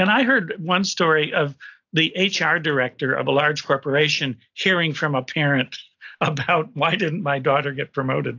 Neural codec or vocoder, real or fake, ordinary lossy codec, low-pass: none; real; AAC, 48 kbps; 7.2 kHz